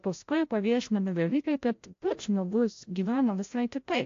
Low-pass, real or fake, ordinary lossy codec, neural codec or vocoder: 7.2 kHz; fake; AAC, 48 kbps; codec, 16 kHz, 0.5 kbps, FreqCodec, larger model